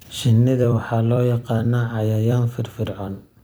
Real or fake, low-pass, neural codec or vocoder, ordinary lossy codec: fake; none; vocoder, 44.1 kHz, 128 mel bands every 256 samples, BigVGAN v2; none